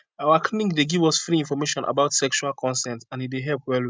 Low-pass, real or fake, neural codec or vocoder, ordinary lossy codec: none; real; none; none